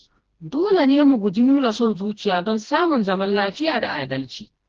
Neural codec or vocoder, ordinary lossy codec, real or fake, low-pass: codec, 16 kHz, 1 kbps, FreqCodec, smaller model; Opus, 16 kbps; fake; 7.2 kHz